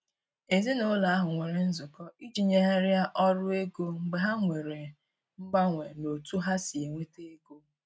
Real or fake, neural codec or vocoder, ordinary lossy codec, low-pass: real; none; none; none